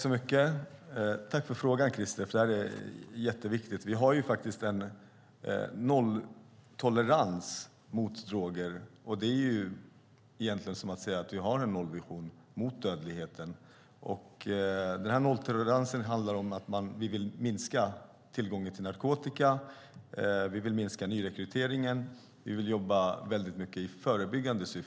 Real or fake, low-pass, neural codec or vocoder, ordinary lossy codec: real; none; none; none